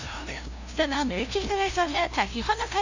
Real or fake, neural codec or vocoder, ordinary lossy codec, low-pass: fake; codec, 16 kHz, 0.5 kbps, FunCodec, trained on LibriTTS, 25 frames a second; none; 7.2 kHz